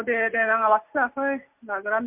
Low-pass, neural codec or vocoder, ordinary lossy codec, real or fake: 3.6 kHz; none; MP3, 32 kbps; real